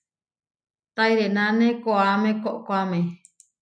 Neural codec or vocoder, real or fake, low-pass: none; real; 9.9 kHz